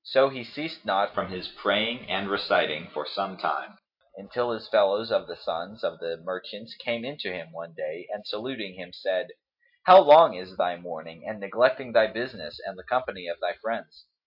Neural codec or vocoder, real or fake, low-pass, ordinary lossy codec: none; real; 5.4 kHz; AAC, 48 kbps